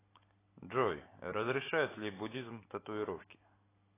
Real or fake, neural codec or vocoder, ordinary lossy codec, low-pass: real; none; AAC, 16 kbps; 3.6 kHz